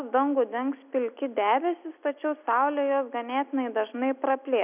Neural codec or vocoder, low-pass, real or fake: none; 3.6 kHz; real